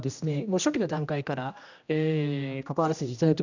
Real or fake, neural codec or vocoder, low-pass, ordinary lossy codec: fake; codec, 16 kHz, 1 kbps, X-Codec, HuBERT features, trained on general audio; 7.2 kHz; none